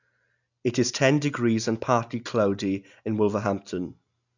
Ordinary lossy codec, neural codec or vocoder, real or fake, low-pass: none; none; real; 7.2 kHz